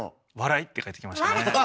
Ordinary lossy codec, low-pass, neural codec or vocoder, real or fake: none; none; none; real